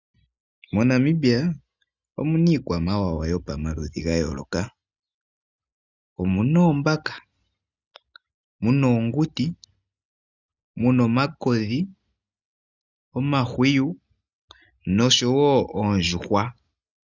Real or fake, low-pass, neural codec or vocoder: real; 7.2 kHz; none